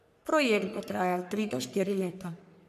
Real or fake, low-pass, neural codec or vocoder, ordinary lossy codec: fake; 14.4 kHz; codec, 44.1 kHz, 3.4 kbps, Pupu-Codec; none